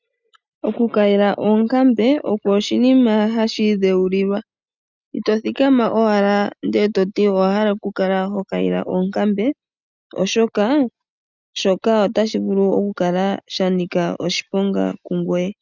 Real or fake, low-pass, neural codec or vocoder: real; 7.2 kHz; none